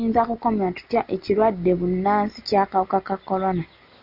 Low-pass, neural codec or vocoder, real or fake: 5.4 kHz; none; real